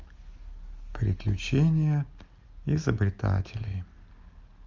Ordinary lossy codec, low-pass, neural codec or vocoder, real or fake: Opus, 32 kbps; 7.2 kHz; none; real